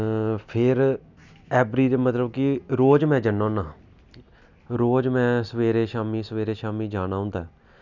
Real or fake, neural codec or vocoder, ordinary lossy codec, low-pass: real; none; none; 7.2 kHz